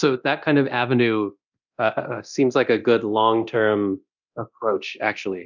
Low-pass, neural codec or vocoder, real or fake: 7.2 kHz; codec, 24 kHz, 0.9 kbps, DualCodec; fake